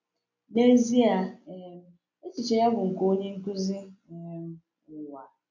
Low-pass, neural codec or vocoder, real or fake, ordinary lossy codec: 7.2 kHz; none; real; none